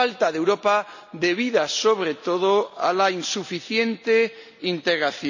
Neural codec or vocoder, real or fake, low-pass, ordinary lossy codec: none; real; 7.2 kHz; none